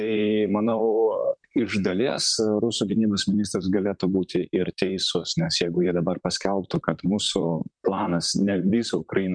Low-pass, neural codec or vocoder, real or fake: 9.9 kHz; vocoder, 44.1 kHz, 128 mel bands, Pupu-Vocoder; fake